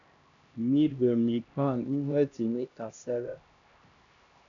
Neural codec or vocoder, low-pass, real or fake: codec, 16 kHz, 1 kbps, X-Codec, HuBERT features, trained on LibriSpeech; 7.2 kHz; fake